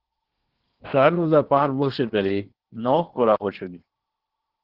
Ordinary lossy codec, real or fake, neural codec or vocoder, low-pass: Opus, 16 kbps; fake; codec, 16 kHz in and 24 kHz out, 0.8 kbps, FocalCodec, streaming, 65536 codes; 5.4 kHz